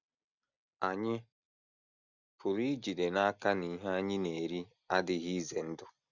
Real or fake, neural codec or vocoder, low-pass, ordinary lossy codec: real; none; 7.2 kHz; none